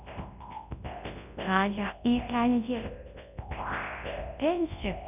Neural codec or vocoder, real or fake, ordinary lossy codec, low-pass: codec, 24 kHz, 0.9 kbps, WavTokenizer, large speech release; fake; none; 3.6 kHz